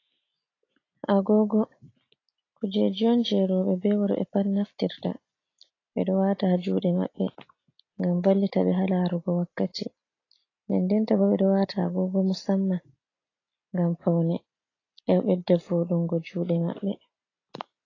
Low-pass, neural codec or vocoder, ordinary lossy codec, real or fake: 7.2 kHz; none; AAC, 32 kbps; real